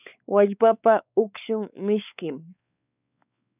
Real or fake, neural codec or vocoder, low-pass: fake; codec, 16 kHz, 4 kbps, X-Codec, WavLM features, trained on Multilingual LibriSpeech; 3.6 kHz